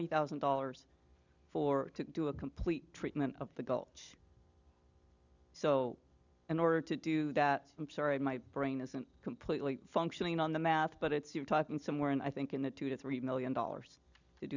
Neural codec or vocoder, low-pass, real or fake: none; 7.2 kHz; real